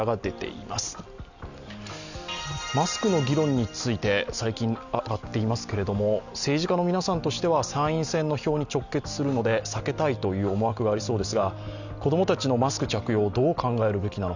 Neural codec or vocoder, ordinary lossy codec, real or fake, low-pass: none; none; real; 7.2 kHz